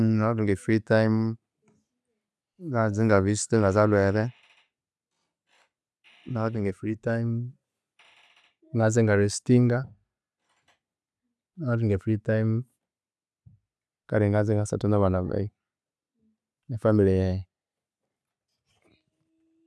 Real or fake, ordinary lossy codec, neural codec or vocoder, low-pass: real; none; none; none